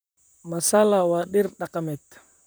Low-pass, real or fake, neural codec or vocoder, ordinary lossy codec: none; fake; vocoder, 44.1 kHz, 128 mel bands, Pupu-Vocoder; none